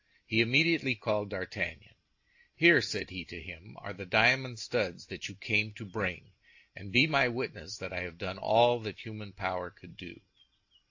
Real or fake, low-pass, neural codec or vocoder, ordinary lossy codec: real; 7.2 kHz; none; AAC, 48 kbps